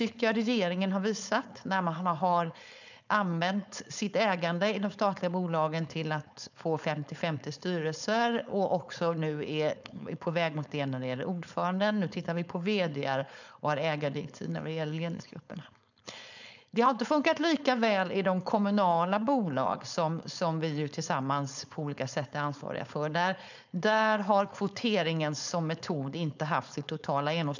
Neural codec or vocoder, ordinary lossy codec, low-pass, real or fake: codec, 16 kHz, 4.8 kbps, FACodec; none; 7.2 kHz; fake